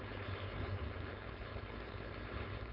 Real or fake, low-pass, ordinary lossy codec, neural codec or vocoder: fake; 5.4 kHz; none; codec, 16 kHz, 4.8 kbps, FACodec